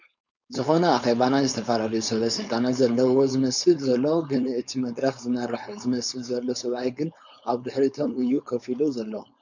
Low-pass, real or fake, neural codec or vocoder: 7.2 kHz; fake; codec, 16 kHz, 4.8 kbps, FACodec